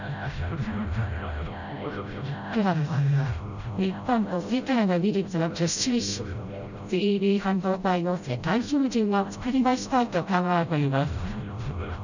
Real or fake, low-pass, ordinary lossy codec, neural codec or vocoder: fake; 7.2 kHz; none; codec, 16 kHz, 0.5 kbps, FreqCodec, smaller model